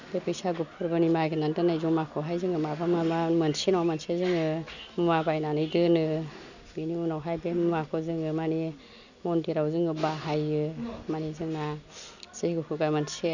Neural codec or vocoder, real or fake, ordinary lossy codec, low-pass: none; real; none; 7.2 kHz